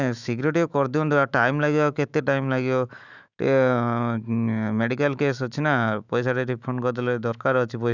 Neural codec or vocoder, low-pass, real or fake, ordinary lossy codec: autoencoder, 48 kHz, 128 numbers a frame, DAC-VAE, trained on Japanese speech; 7.2 kHz; fake; none